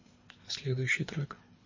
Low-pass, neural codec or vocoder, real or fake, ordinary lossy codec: 7.2 kHz; codec, 24 kHz, 3 kbps, HILCodec; fake; MP3, 32 kbps